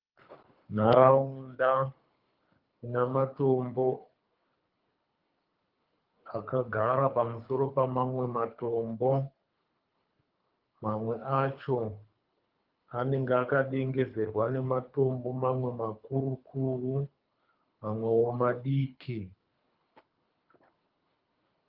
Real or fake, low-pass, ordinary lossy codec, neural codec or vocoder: fake; 5.4 kHz; Opus, 24 kbps; codec, 24 kHz, 3 kbps, HILCodec